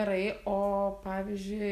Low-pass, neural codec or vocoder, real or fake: 14.4 kHz; none; real